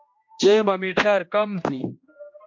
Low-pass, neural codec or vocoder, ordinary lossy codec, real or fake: 7.2 kHz; codec, 16 kHz, 1 kbps, X-Codec, HuBERT features, trained on general audio; MP3, 48 kbps; fake